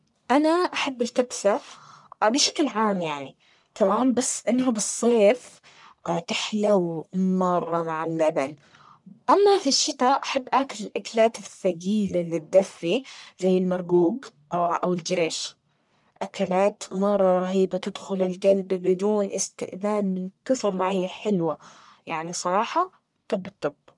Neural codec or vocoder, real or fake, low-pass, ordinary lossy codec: codec, 44.1 kHz, 1.7 kbps, Pupu-Codec; fake; 10.8 kHz; none